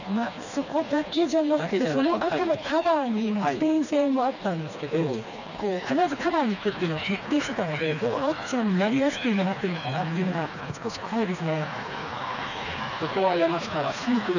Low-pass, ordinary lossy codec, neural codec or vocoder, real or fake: 7.2 kHz; none; codec, 16 kHz, 2 kbps, FreqCodec, smaller model; fake